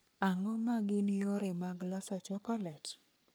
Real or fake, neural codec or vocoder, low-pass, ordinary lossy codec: fake; codec, 44.1 kHz, 3.4 kbps, Pupu-Codec; none; none